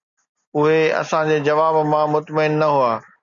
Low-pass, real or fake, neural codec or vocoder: 7.2 kHz; real; none